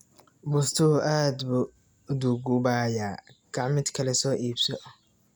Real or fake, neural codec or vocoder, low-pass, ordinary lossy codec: real; none; none; none